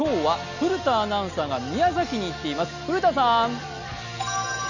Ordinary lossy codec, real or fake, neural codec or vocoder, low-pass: none; real; none; 7.2 kHz